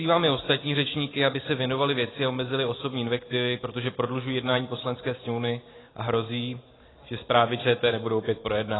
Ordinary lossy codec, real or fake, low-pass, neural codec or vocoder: AAC, 16 kbps; real; 7.2 kHz; none